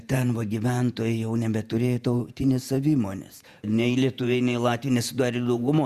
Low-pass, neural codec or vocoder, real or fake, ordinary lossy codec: 14.4 kHz; vocoder, 48 kHz, 128 mel bands, Vocos; fake; Opus, 64 kbps